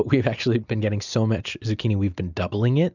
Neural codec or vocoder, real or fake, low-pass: none; real; 7.2 kHz